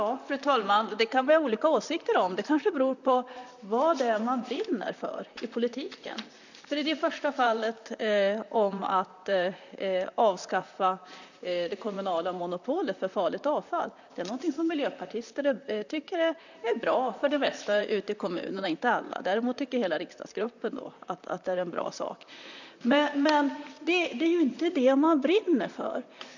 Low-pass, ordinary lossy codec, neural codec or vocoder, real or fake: 7.2 kHz; none; vocoder, 44.1 kHz, 128 mel bands, Pupu-Vocoder; fake